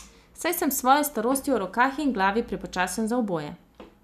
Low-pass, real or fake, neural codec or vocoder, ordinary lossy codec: 14.4 kHz; real; none; none